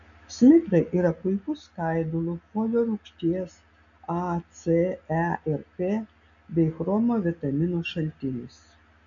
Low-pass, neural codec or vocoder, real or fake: 7.2 kHz; none; real